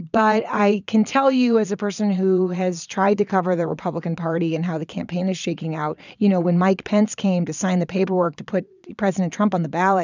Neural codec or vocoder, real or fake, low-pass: vocoder, 22.05 kHz, 80 mel bands, Vocos; fake; 7.2 kHz